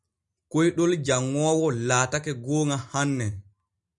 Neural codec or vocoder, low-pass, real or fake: none; 10.8 kHz; real